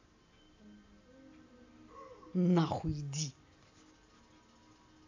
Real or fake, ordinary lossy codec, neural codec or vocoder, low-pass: real; AAC, 48 kbps; none; 7.2 kHz